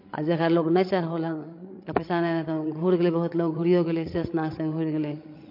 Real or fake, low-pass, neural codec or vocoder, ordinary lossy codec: fake; 5.4 kHz; codec, 16 kHz, 16 kbps, FreqCodec, larger model; MP3, 32 kbps